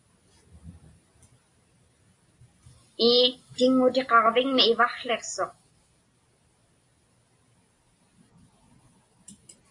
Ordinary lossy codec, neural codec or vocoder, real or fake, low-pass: AAC, 64 kbps; none; real; 10.8 kHz